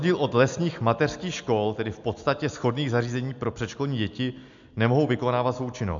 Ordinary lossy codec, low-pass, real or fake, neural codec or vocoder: MP3, 64 kbps; 7.2 kHz; real; none